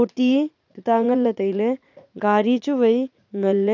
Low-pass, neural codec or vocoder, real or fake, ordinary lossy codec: 7.2 kHz; vocoder, 44.1 kHz, 80 mel bands, Vocos; fake; none